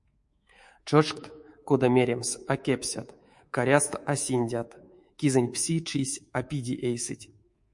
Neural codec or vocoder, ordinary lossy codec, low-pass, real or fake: codec, 24 kHz, 3.1 kbps, DualCodec; MP3, 48 kbps; 10.8 kHz; fake